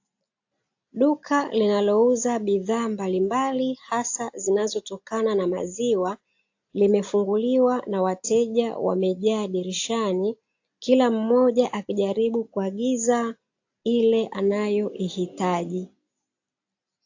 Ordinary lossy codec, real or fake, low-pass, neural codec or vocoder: AAC, 48 kbps; real; 7.2 kHz; none